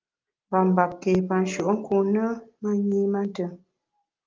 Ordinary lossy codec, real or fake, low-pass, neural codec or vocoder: Opus, 32 kbps; real; 7.2 kHz; none